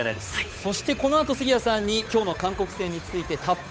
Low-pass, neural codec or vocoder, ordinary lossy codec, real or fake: none; codec, 16 kHz, 8 kbps, FunCodec, trained on Chinese and English, 25 frames a second; none; fake